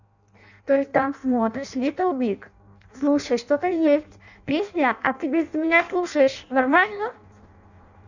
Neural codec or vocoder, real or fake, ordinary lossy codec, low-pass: codec, 16 kHz in and 24 kHz out, 0.6 kbps, FireRedTTS-2 codec; fake; none; 7.2 kHz